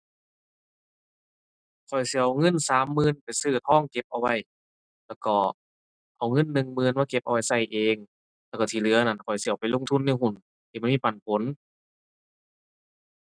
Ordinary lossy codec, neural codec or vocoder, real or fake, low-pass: none; none; real; 9.9 kHz